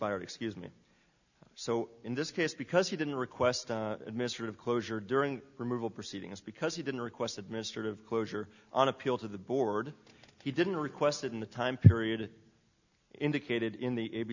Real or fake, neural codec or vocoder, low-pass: real; none; 7.2 kHz